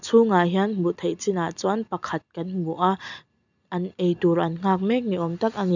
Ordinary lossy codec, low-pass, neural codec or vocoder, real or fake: none; 7.2 kHz; none; real